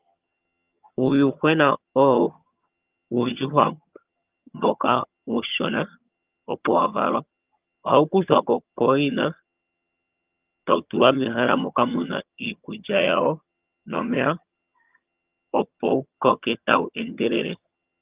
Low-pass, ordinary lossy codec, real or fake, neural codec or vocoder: 3.6 kHz; Opus, 24 kbps; fake; vocoder, 22.05 kHz, 80 mel bands, HiFi-GAN